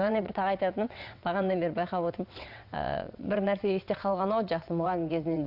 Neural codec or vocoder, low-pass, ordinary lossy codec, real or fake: vocoder, 22.05 kHz, 80 mel bands, WaveNeXt; 5.4 kHz; none; fake